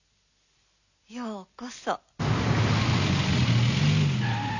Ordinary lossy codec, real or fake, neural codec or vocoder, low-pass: none; real; none; 7.2 kHz